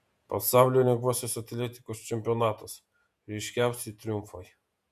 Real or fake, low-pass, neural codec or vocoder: fake; 14.4 kHz; vocoder, 48 kHz, 128 mel bands, Vocos